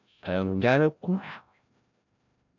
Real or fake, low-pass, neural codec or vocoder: fake; 7.2 kHz; codec, 16 kHz, 0.5 kbps, FreqCodec, larger model